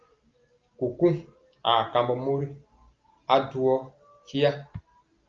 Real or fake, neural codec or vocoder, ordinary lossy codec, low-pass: real; none; Opus, 24 kbps; 7.2 kHz